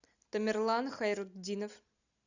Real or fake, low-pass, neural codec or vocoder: real; 7.2 kHz; none